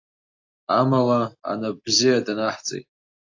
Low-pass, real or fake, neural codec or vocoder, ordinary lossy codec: 7.2 kHz; real; none; AAC, 48 kbps